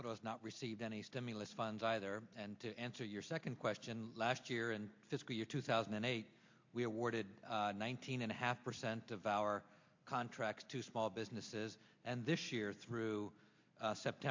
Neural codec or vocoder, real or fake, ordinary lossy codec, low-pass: none; real; MP3, 48 kbps; 7.2 kHz